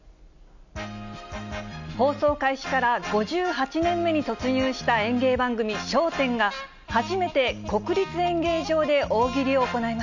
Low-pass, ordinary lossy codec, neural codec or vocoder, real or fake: 7.2 kHz; none; none; real